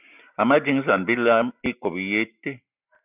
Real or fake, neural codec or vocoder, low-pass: real; none; 3.6 kHz